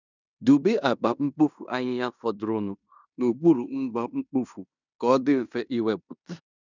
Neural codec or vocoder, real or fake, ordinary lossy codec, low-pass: codec, 16 kHz in and 24 kHz out, 0.9 kbps, LongCat-Audio-Codec, fine tuned four codebook decoder; fake; none; 7.2 kHz